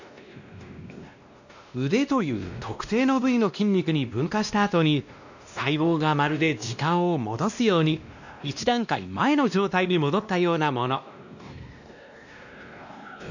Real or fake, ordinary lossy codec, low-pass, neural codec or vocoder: fake; none; 7.2 kHz; codec, 16 kHz, 1 kbps, X-Codec, WavLM features, trained on Multilingual LibriSpeech